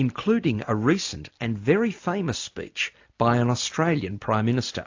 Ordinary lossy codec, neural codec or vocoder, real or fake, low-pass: AAC, 48 kbps; none; real; 7.2 kHz